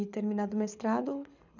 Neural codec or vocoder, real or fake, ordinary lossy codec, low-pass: codec, 24 kHz, 6 kbps, HILCodec; fake; none; 7.2 kHz